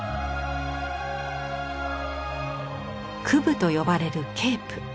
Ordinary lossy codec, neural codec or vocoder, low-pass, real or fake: none; none; none; real